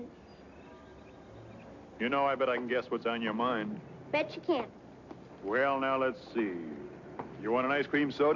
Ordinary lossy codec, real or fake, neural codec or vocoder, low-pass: MP3, 48 kbps; real; none; 7.2 kHz